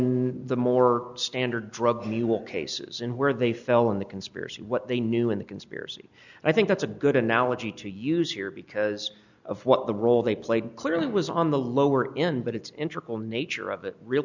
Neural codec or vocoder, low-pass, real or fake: none; 7.2 kHz; real